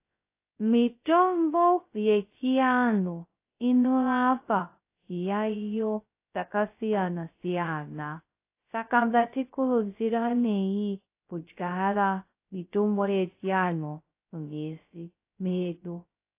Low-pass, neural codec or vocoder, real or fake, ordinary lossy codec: 3.6 kHz; codec, 16 kHz, 0.2 kbps, FocalCodec; fake; AAC, 24 kbps